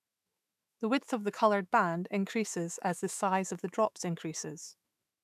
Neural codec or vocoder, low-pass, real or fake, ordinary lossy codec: autoencoder, 48 kHz, 128 numbers a frame, DAC-VAE, trained on Japanese speech; 14.4 kHz; fake; none